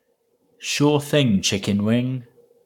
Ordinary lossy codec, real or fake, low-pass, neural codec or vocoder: none; fake; 19.8 kHz; codec, 44.1 kHz, 7.8 kbps, Pupu-Codec